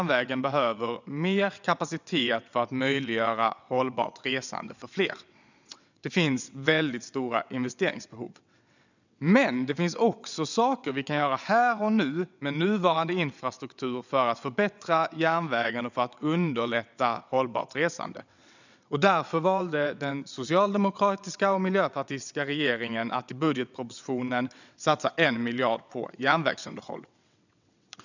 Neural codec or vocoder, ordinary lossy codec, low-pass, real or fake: vocoder, 22.05 kHz, 80 mel bands, WaveNeXt; none; 7.2 kHz; fake